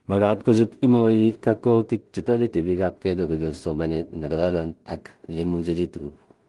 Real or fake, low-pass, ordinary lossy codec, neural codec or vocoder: fake; 10.8 kHz; Opus, 24 kbps; codec, 16 kHz in and 24 kHz out, 0.4 kbps, LongCat-Audio-Codec, two codebook decoder